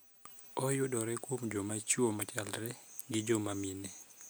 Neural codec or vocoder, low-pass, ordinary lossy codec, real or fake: none; none; none; real